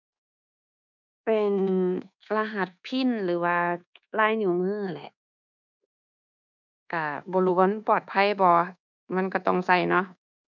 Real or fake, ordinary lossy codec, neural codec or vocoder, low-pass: fake; none; codec, 24 kHz, 1.2 kbps, DualCodec; 7.2 kHz